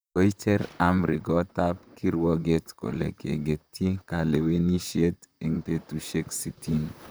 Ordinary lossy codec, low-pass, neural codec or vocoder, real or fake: none; none; vocoder, 44.1 kHz, 128 mel bands, Pupu-Vocoder; fake